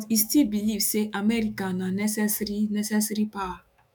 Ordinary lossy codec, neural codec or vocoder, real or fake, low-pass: none; autoencoder, 48 kHz, 128 numbers a frame, DAC-VAE, trained on Japanese speech; fake; none